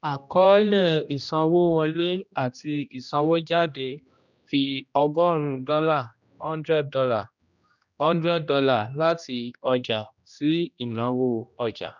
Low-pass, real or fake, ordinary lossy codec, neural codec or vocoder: 7.2 kHz; fake; none; codec, 16 kHz, 1 kbps, X-Codec, HuBERT features, trained on general audio